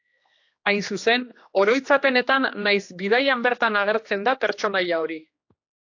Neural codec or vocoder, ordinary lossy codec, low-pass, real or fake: codec, 16 kHz, 2 kbps, X-Codec, HuBERT features, trained on general audio; AAC, 48 kbps; 7.2 kHz; fake